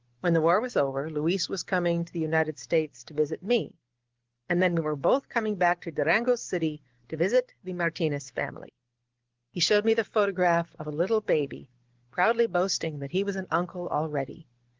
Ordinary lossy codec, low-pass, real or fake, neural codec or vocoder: Opus, 16 kbps; 7.2 kHz; real; none